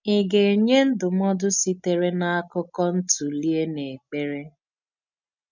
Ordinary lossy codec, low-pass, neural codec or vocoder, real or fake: none; 7.2 kHz; none; real